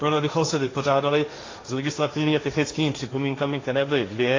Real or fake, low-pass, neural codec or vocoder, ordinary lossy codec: fake; 7.2 kHz; codec, 16 kHz, 1.1 kbps, Voila-Tokenizer; AAC, 32 kbps